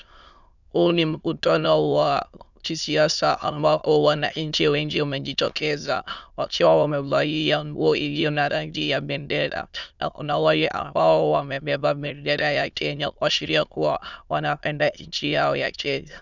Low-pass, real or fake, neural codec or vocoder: 7.2 kHz; fake; autoencoder, 22.05 kHz, a latent of 192 numbers a frame, VITS, trained on many speakers